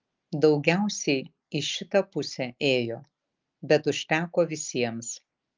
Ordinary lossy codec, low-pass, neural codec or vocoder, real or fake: Opus, 24 kbps; 7.2 kHz; none; real